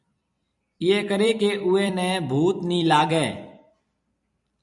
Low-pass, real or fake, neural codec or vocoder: 10.8 kHz; fake; vocoder, 44.1 kHz, 128 mel bands every 256 samples, BigVGAN v2